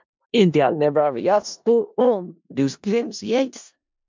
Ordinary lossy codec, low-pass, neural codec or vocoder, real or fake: MP3, 64 kbps; 7.2 kHz; codec, 16 kHz in and 24 kHz out, 0.4 kbps, LongCat-Audio-Codec, four codebook decoder; fake